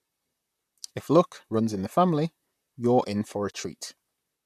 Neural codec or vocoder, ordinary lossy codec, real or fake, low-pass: vocoder, 44.1 kHz, 128 mel bands, Pupu-Vocoder; none; fake; 14.4 kHz